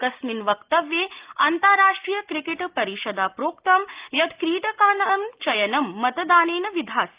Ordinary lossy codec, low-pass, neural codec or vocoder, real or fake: Opus, 32 kbps; 3.6 kHz; none; real